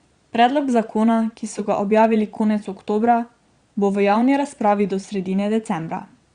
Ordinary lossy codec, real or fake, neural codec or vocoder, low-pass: Opus, 64 kbps; fake; vocoder, 22.05 kHz, 80 mel bands, Vocos; 9.9 kHz